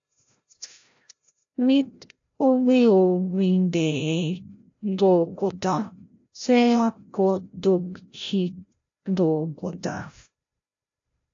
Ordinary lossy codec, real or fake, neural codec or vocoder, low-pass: AAC, 48 kbps; fake; codec, 16 kHz, 0.5 kbps, FreqCodec, larger model; 7.2 kHz